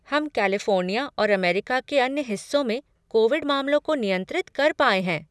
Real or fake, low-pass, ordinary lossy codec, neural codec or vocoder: real; none; none; none